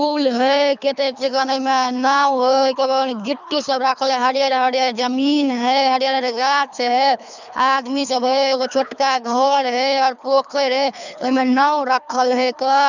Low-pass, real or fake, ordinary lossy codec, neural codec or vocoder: 7.2 kHz; fake; none; codec, 24 kHz, 3 kbps, HILCodec